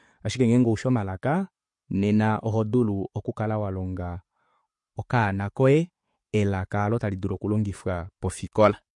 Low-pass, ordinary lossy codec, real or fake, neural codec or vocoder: 10.8 kHz; MP3, 48 kbps; real; none